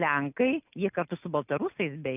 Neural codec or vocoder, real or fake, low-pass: none; real; 3.6 kHz